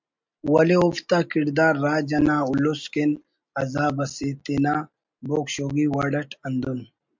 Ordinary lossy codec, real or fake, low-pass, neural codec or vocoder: MP3, 64 kbps; real; 7.2 kHz; none